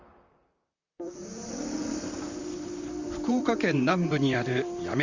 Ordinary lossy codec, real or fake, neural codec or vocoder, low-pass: Opus, 64 kbps; fake; vocoder, 44.1 kHz, 128 mel bands, Pupu-Vocoder; 7.2 kHz